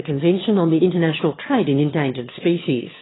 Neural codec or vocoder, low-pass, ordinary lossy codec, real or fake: autoencoder, 22.05 kHz, a latent of 192 numbers a frame, VITS, trained on one speaker; 7.2 kHz; AAC, 16 kbps; fake